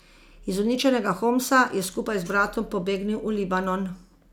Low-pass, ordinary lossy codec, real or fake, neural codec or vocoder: 19.8 kHz; none; real; none